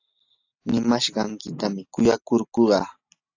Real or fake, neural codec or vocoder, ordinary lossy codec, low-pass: real; none; AAC, 48 kbps; 7.2 kHz